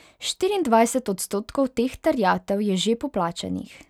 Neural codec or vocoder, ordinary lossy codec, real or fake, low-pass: none; none; real; 19.8 kHz